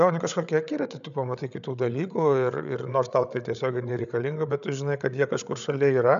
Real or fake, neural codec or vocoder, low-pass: fake; codec, 16 kHz, 8 kbps, FreqCodec, larger model; 7.2 kHz